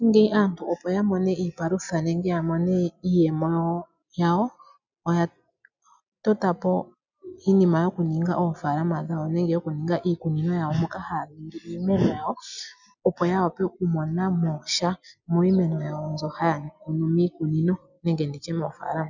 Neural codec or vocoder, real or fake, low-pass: none; real; 7.2 kHz